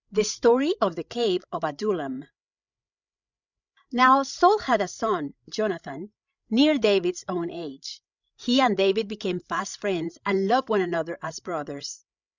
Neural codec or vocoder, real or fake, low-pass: codec, 16 kHz, 16 kbps, FreqCodec, larger model; fake; 7.2 kHz